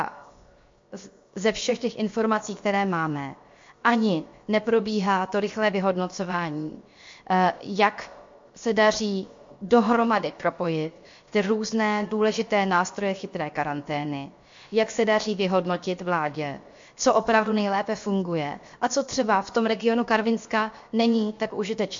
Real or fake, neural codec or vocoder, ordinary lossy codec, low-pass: fake; codec, 16 kHz, 0.7 kbps, FocalCodec; MP3, 48 kbps; 7.2 kHz